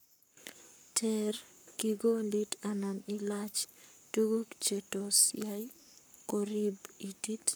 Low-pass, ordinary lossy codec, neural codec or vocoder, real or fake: none; none; codec, 44.1 kHz, 7.8 kbps, Pupu-Codec; fake